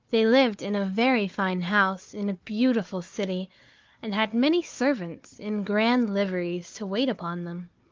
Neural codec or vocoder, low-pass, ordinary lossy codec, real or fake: codec, 16 kHz, 4 kbps, FunCodec, trained on Chinese and English, 50 frames a second; 7.2 kHz; Opus, 24 kbps; fake